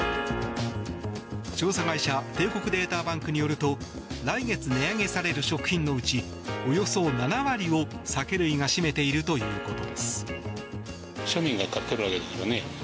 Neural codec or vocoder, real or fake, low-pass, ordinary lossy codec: none; real; none; none